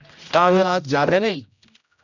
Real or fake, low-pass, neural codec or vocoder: fake; 7.2 kHz; codec, 16 kHz, 0.5 kbps, X-Codec, HuBERT features, trained on general audio